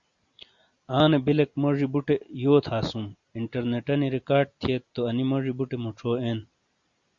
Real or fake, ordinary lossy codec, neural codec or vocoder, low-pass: real; Opus, 64 kbps; none; 7.2 kHz